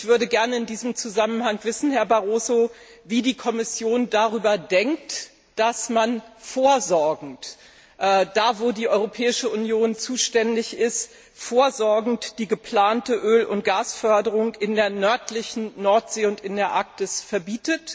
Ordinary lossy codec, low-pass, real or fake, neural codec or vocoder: none; none; real; none